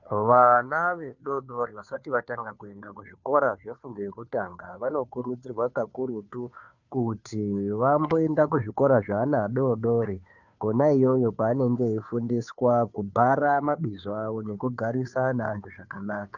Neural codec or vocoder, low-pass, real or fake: codec, 16 kHz, 2 kbps, FunCodec, trained on Chinese and English, 25 frames a second; 7.2 kHz; fake